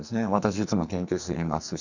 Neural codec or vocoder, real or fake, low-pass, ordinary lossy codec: codec, 44.1 kHz, 2.6 kbps, SNAC; fake; 7.2 kHz; none